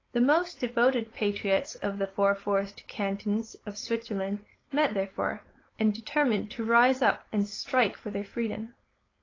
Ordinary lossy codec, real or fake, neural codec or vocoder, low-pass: AAC, 32 kbps; fake; codec, 16 kHz, 4.8 kbps, FACodec; 7.2 kHz